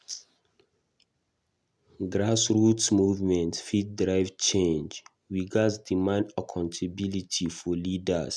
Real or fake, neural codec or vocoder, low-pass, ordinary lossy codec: real; none; none; none